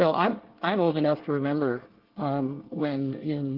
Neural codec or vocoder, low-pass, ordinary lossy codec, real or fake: codec, 24 kHz, 1 kbps, SNAC; 5.4 kHz; Opus, 16 kbps; fake